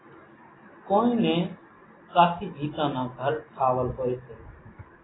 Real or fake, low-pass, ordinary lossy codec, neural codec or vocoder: real; 7.2 kHz; AAC, 16 kbps; none